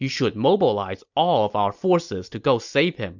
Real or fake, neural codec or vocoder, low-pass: real; none; 7.2 kHz